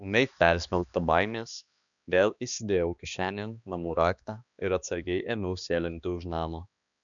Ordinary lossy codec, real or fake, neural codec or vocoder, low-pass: MP3, 96 kbps; fake; codec, 16 kHz, 2 kbps, X-Codec, HuBERT features, trained on balanced general audio; 7.2 kHz